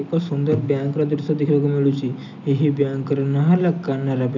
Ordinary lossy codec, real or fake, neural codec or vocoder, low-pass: none; real; none; 7.2 kHz